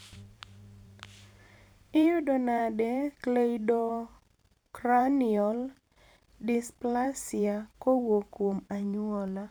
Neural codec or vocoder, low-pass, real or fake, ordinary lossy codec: vocoder, 44.1 kHz, 128 mel bands every 512 samples, BigVGAN v2; none; fake; none